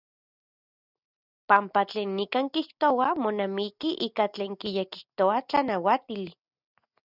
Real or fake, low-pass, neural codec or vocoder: real; 5.4 kHz; none